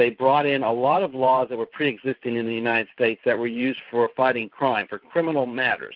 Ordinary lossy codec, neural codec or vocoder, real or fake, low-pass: Opus, 24 kbps; vocoder, 44.1 kHz, 128 mel bands every 512 samples, BigVGAN v2; fake; 5.4 kHz